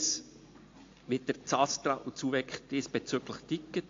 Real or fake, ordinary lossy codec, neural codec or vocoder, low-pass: real; AAC, 48 kbps; none; 7.2 kHz